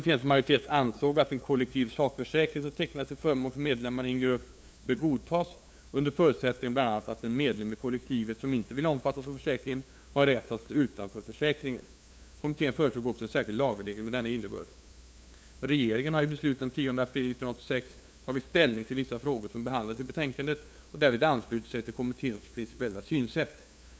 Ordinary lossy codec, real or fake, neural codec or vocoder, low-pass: none; fake; codec, 16 kHz, 2 kbps, FunCodec, trained on LibriTTS, 25 frames a second; none